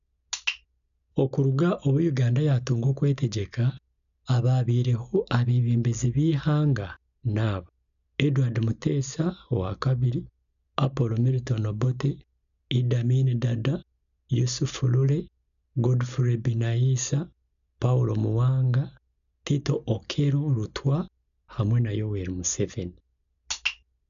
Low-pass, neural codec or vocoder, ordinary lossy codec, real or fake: 7.2 kHz; none; none; real